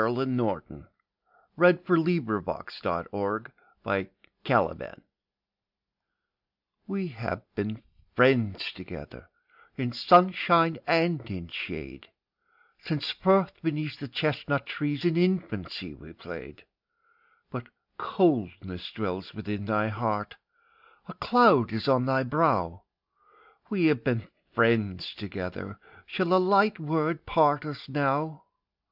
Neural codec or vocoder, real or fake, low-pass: none; real; 5.4 kHz